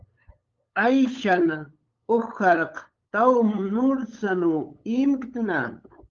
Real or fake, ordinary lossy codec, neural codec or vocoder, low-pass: fake; Opus, 32 kbps; codec, 16 kHz, 8 kbps, FunCodec, trained on LibriTTS, 25 frames a second; 7.2 kHz